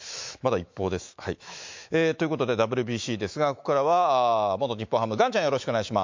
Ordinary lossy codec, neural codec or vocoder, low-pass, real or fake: MP3, 48 kbps; autoencoder, 48 kHz, 128 numbers a frame, DAC-VAE, trained on Japanese speech; 7.2 kHz; fake